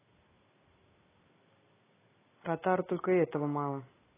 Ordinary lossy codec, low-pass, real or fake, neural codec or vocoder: AAC, 16 kbps; 3.6 kHz; real; none